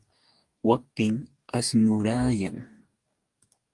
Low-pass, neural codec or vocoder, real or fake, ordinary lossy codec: 10.8 kHz; codec, 44.1 kHz, 2.6 kbps, DAC; fake; Opus, 32 kbps